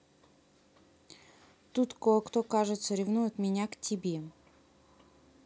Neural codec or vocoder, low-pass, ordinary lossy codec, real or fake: none; none; none; real